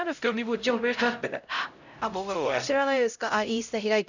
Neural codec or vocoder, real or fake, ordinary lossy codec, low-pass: codec, 16 kHz, 0.5 kbps, X-Codec, HuBERT features, trained on LibriSpeech; fake; none; 7.2 kHz